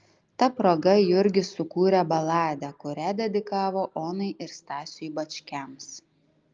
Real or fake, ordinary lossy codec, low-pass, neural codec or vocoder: real; Opus, 32 kbps; 7.2 kHz; none